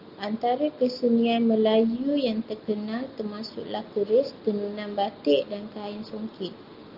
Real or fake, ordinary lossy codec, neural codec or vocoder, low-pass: real; Opus, 24 kbps; none; 5.4 kHz